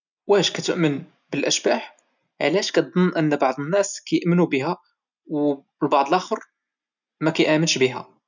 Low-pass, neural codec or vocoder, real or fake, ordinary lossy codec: 7.2 kHz; none; real; none